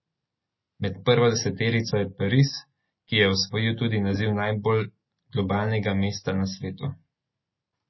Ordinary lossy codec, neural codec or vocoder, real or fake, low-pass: MP3, 24 kbps; none; real; 7.2 kHz